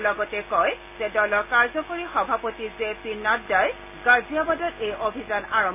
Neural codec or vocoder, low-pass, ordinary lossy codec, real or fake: none; 3.6 kHz; none; real